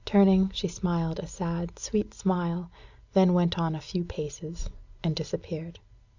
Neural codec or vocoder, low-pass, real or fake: none; 7.2 kHz; real